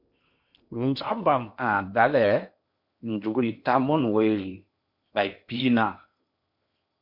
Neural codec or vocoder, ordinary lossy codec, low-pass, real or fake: codec, 16 kHz in and 24 kHz out, 0.8 kbps, FocalCodec, streaming, 65536 codes; MP3, 48 kbps; 5.4 kHz; fake